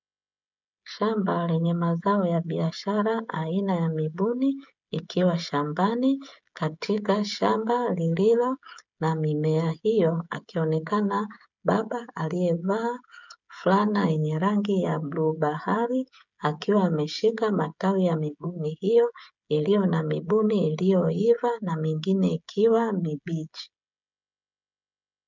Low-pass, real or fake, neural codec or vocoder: 7.2 kHz; fake; codec, 16 kHz, 16 kbps, FreqCodec, smaller model